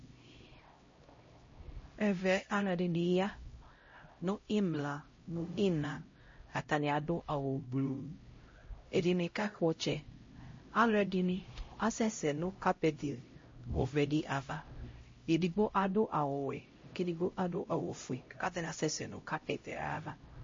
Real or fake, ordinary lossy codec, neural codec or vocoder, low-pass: fake; MP3, 32 kbps; codec, 16 kHz, 0.5 kbps, X-Codec, HuBERT features, trained on LibriSpeech; 7.2 kHz